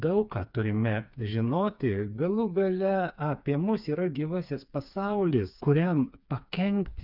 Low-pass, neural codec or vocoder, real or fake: 5.4 kHz; codec, 16 kHz, 4 kbps, FreqCodec, smaller model; fake